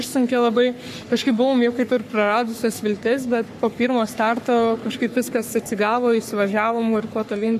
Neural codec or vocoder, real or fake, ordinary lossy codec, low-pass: codec, 44.1 kHz, 3.4 kbps, Pupu-Codec; fake; AAC, 96 kbps; 14.4 kHz